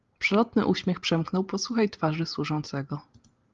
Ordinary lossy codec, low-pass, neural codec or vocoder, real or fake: Opus, 32 kbps; 7.2 kHz; none; real